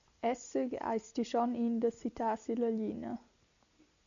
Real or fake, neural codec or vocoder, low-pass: real; none; 7.2 kHz